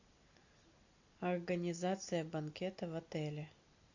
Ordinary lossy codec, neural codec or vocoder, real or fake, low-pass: AAC, 48 kbps; none; real; 7.2 kHz